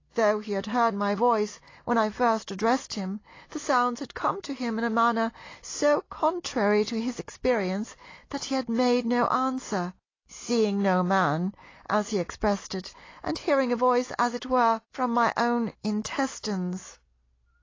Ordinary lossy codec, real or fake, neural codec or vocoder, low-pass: AAC, 32 kbps; real; none; 7.2 kHz